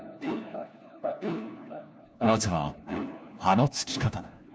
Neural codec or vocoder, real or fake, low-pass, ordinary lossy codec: codec, 16 kHz, 1 kbps, FunCodec, trained on LibriTTS, 50 frames a second; fake; none; none